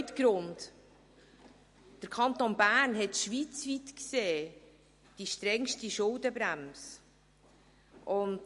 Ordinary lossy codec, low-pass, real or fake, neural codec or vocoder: MP3, 48 kbps; 14.4 kHz; real; none